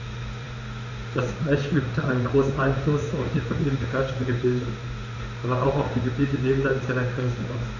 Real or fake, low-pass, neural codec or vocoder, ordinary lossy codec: fake; 7.2 kHz; codec, 16 kHz in and 24 kHz out, 1 kbps, XY-Tokenizer; none